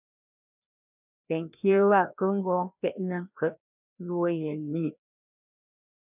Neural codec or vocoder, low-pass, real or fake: codec, 16 kHz, 1 kbps, FreqCodec, larger model; 3.6 kHz; fake